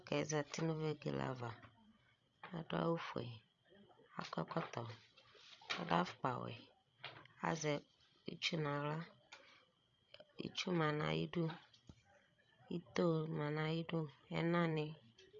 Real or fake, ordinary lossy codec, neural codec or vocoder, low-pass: fake; MP3, 64 kbps; codec, 16 kHz, 16 kbps, FreqCodec, larger model; 7.2 kHz